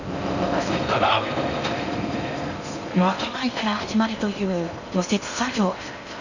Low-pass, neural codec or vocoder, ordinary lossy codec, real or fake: 7.2 kHz; codec, 16 kHz in and 24 kHz out, 0.6 kbps, FocalCodec, streaming, 4096 codes; none; fake